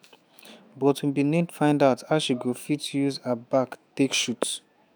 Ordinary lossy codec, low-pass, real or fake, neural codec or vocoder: none; none; fake; autoencoder, 48 kHz, 128 numbers a frame, DAC-VAE, trained on Japanese speech